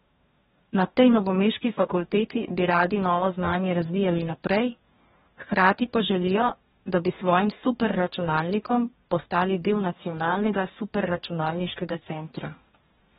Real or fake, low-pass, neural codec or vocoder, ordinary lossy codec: fake; 19.8 kHz; codec, 44.1 kHz, 2.6 kbps, DAC; AAC, 16 kbps